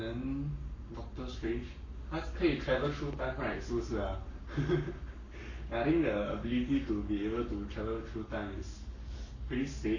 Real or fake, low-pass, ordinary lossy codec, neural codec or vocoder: fake; 7.2 kHz; none; codec, 44.1 kHz, 7.8 kbps, Pupu-Codec